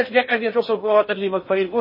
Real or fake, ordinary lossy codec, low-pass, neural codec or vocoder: fake; MP3, 24 kbps; 5.4 kHz; codec, 16 kHz in and 24 kHz out, 0.6 kbps, FocalCodec, streaming, 4096 codes